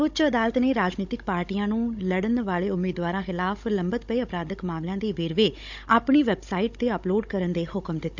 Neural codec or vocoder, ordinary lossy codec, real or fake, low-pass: codec, 16 kHz, 16 kbps, FunCodec, trained on Chinese and English, 50 frames a second; none; fake; 7.2 kHz